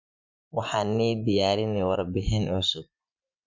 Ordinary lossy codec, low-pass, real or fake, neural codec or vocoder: MP3, 64 kbps; 7.2 kHz; fake; vocoder, 24 kHz, 100 mel bands, Vocos